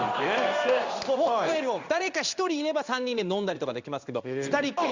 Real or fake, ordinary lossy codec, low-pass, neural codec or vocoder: fake; Opus, 64 kbps; 7.2 kHz; codec, 16 kHz in and 24 kHz out, 1 kbps, XY-Tokenizer